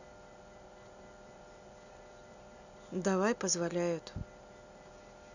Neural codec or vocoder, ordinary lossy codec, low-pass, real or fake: none; none; 7.2 kHz; real